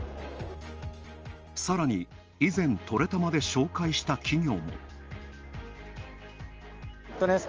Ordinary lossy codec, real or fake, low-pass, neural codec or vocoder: Opus, 24 kbps; real; 7.2 kHz; none